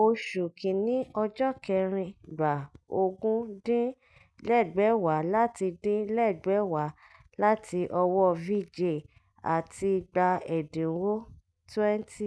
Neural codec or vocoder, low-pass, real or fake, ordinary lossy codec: none; none; real; none